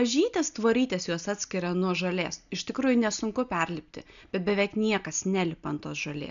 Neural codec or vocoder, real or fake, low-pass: none; real; 7.2 kHz